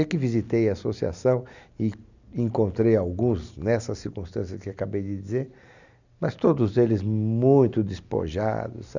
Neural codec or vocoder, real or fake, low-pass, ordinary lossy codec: none; real; 7.2 kHz; none